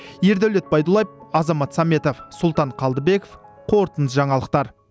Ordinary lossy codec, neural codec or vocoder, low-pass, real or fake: none; none; none; real